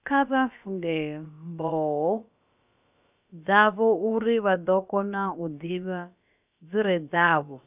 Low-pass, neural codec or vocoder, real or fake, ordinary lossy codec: 3.6 kHz; codec, 16 kHz, about 1 kbps, DyCAST, with the encoder's durations; fake; none